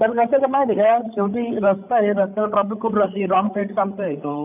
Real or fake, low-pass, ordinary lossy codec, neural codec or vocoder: fake; 3.6 kHz; none; codec, 16 kHz, 8 kbps, FreqCodec, larger model